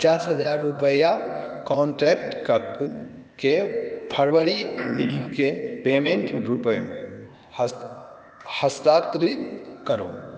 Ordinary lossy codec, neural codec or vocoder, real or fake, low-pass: none; codec, 16 kHz, 0.8 kbps, ZipCodec; fake; none